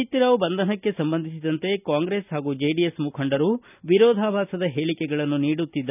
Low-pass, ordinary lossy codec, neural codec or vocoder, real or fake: 3.6 kHz; none; none; real